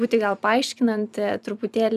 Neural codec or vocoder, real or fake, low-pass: vocoder, 44.1 kHz, 128 mel bands every 256 samples, BigVGAN v2; fake; 14.4 kHz